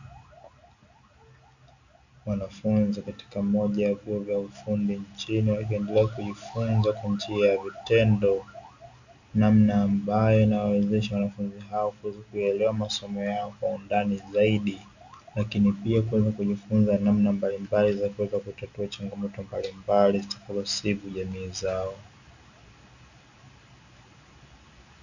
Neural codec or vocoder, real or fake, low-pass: none; real; 7.2 kHz